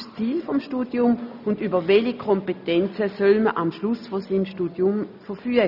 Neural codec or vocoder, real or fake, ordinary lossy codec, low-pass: none; real; none; 5.4 kHz